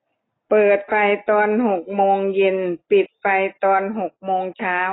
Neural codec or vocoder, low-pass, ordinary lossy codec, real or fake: none; 7.2 kHz; AAC, 16 kbps; real